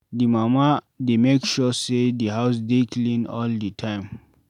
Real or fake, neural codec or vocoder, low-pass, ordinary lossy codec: real; none; 19.8 kHz; none